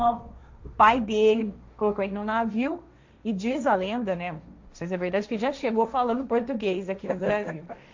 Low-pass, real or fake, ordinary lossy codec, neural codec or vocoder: 7.2 kHz; fake; MP3, 64 kbps; codec, 16 kHz, 1.1 kbps, Voila-Tokenizer